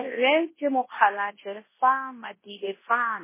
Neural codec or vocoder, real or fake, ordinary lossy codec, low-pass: codec, 24 kHz, 0.9 kbps, WavTokenizer, large speech release; fake; MP3, 16 kbps; 3.6 kHz